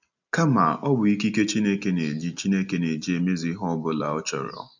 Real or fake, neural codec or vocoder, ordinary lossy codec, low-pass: real; none; none; 7.2 kHz